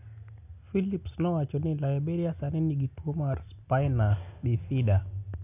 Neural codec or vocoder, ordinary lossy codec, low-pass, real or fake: none; none; 3.6 kHz; real